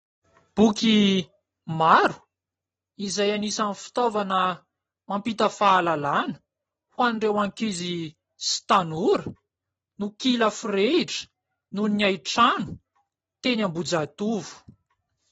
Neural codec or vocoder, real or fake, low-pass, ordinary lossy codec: none; real; 19.8 kHz; AAC, 24 kbps